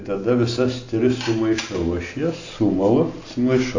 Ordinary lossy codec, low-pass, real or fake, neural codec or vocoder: AAC, 48 kbps; 7.2 kHz; real; none